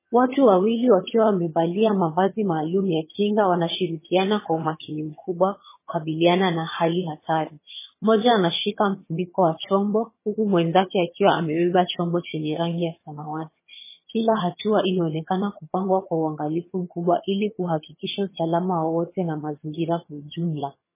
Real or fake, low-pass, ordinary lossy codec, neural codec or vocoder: fake; 3.6 kHz; MP3, 16 kbps; vocoder, 22.05 kHz, 80 mel bands, HiFi-GAN